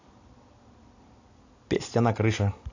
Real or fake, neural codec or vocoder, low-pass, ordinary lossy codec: real; none; 7.2 kHz; AAC, 48 kbps